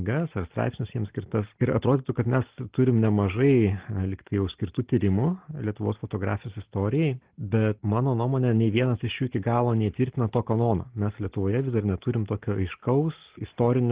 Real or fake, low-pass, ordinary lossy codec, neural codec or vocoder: real; 3.6 kHz; Opus, 16 kbps; none